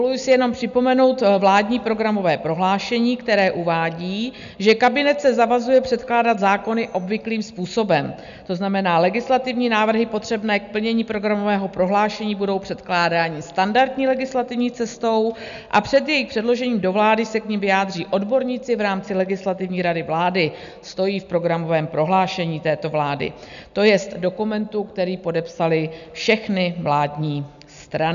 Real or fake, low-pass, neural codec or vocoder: real; 7.2 kHz; none